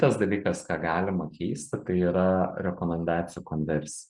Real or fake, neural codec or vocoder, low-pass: real; none; 10.8 kHz